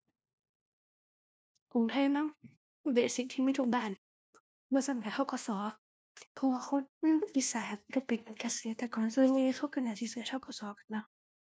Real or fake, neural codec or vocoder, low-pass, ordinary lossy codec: fake; codec, 16 kHz, 1 kbps, FunCodec, trained on LibriTTS, 50 frames a second; none; none